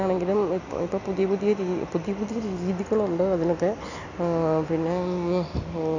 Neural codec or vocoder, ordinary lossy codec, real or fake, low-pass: none; none; real; 7.2 kHz